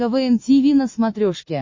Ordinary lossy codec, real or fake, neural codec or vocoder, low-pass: MP3, 32 kbps; real; none; 7.2 kHz